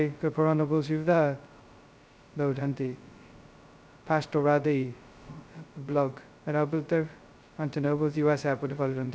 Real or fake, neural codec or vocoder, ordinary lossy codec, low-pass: fake; codec, 16 kHz, 0.2 kbps, FocalCodec; none; none